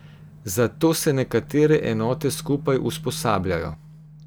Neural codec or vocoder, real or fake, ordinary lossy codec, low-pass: none; real; none; none